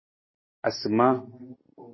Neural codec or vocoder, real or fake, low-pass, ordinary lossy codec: none; real; 7.2 kHz; MP3, 24 kbps